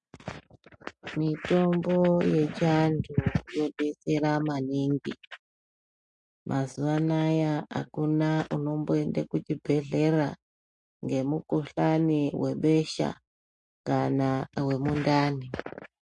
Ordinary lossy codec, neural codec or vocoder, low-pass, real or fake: MP3, 48 kbps; none; 10.8 kHz; real